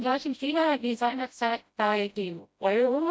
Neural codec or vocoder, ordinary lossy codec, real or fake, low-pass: codec, 16 kHz, 0.5 kbps, FreqCodec, smaller model; none; fake; none